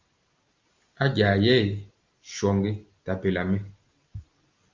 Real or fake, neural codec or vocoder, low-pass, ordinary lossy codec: real; none; 7.2 kHz; Opus, 32 kbps